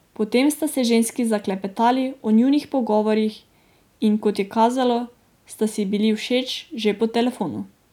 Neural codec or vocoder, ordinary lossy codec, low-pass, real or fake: none; none; 19.8 kHz; real